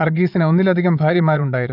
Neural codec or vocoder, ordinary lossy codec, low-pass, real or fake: vocoder, 22.05 kHz, 80 mel bands, Vocos; none; 5.4 kHz; fake